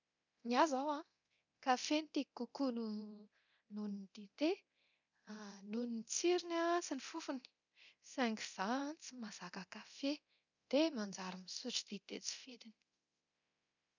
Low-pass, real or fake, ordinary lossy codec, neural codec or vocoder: 7.2 kHz; fake; none; codec, 24 kHz, 0.9 kbps, DualCodec